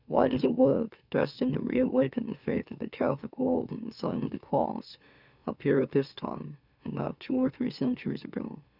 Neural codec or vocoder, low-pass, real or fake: autoencoder, 44.1 kHz, a latent of 192 numbers a frame, MeloTTS; 5.4 kHz; fake